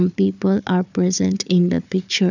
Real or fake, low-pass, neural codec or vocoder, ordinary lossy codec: fake; 7.2 kHz; codec, 16 kHz, 4 kbps, FunCodec, trained on Chinese and English, 50 frames a second; none